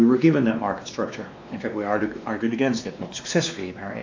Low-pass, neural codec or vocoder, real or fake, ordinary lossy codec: 7.2 kHz; codec, 16 kHz, 2 kbps, X-Codec, WavLM features, trained on Multilingual LibriSpeech; fake; AAC, 48 kbps